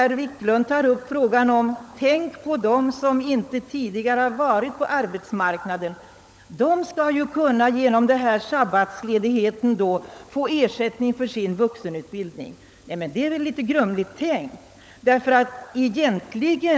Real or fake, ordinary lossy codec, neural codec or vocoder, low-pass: fake; none; codec, 16 kHz, 16 kbps, FunCodec, trained on LibriTTS, 50 frames a second; none